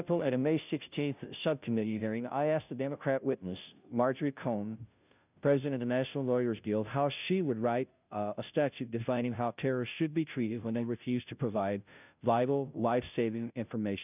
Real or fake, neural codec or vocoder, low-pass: fake; codec, 16 kHz, 0.5 kbps, FunCodec, trained on Chinese and English, 25 frames a second; 3.6 kHz